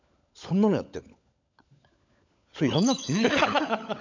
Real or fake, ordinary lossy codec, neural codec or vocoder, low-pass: fake; none; codec, 16 kHz, 16 kbps, FunCodec, trained on LibriTTS, 50 frames a second; 7.2 kHz